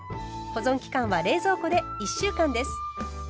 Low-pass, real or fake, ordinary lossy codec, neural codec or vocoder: none; real; none; none